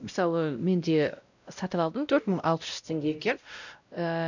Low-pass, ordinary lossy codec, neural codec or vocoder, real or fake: 7.2 kHz; none; codec, 16 kHz, 0.5 kbps, X-Codec, WavLM features, trained on Multilingual LibriSpeech; fake